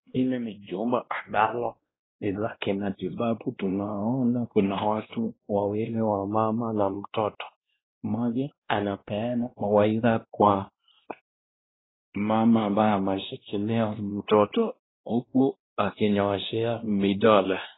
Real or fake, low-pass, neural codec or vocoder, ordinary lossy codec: fake; 7.2 kHz; codec, 16 kHz, 1 kbps, X-Codec, WavLM features, trained on Multilingual LibriSpeech; AAC, 16 kbps